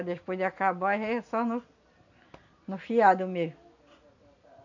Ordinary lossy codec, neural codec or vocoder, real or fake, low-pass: none; none; real; 7.2 kHz